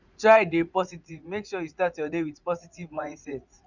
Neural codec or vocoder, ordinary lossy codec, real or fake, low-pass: vocoder, 44.1 kHz, 80 mel bands, Vocos; none; fake; 7.2 kHz